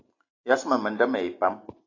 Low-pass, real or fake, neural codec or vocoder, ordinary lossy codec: 7.2 kHz; real; none; AAC, 32 kbps